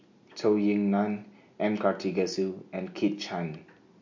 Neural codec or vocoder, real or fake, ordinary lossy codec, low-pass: none; real; MP3, 48 kbps; 7.2 kHz